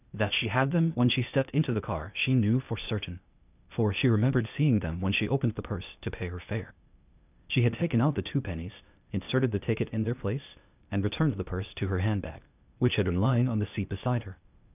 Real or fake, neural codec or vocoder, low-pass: fake; codec, 16 kHz, 0.8 kbps, ZipCodec; 3.6 kHz